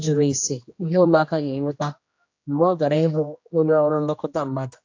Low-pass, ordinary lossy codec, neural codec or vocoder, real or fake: 7.2 kHz; AAC, 48 kbps; codec, 16 kHz, 1 kbps, X-Codec, HuBERT features, trained on general audio; fake